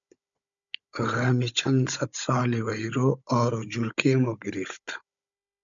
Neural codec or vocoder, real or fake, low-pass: codec, 16 kHz, 16 kbps, FunCodec, trained on Chinese and English, 50 frames a second; fake; 7.2 kHz